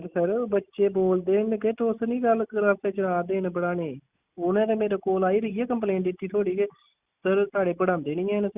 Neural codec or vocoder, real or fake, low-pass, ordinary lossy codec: none; real; 3.6 kHz; Opus, 64 kbps